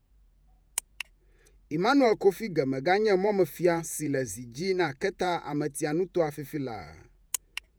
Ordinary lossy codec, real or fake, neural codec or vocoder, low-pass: none; fake; vocoder, 48 kHz, 128 mel bands, Vocos; none